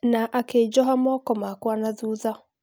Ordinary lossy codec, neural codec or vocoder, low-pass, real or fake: none; none; none; real